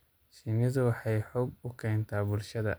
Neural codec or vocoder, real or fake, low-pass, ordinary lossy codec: none; real; none; none